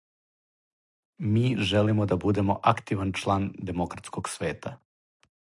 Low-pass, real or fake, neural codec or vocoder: 10.8 kHz; real; none